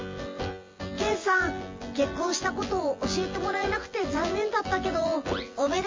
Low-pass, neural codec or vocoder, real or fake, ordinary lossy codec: 7.2 kHz; vocoder, 24 kHz, 100 mel bands, Vocos; fake; MP3, 32 kbps